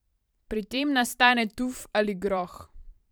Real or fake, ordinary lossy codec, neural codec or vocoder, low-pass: fake; none; vocoder, 44.1 kHz, 128 mel bands every 512 samples, BigVGAN v2; none